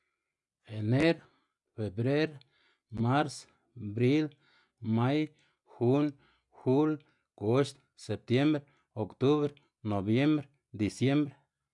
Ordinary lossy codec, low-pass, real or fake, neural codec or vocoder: none; 10.8 kHz; real; none